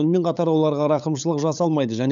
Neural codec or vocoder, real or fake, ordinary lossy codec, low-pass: codec, 16 kHz, 8 kbps, FunCodec, trained on LibriTTS, 25 frames a second; fake; none; 7.2 kHz